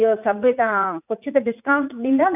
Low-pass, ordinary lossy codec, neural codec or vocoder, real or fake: 3.6 kHz; none; vocoder, 44.1 kHz, 80 mel bands, Vocos; fake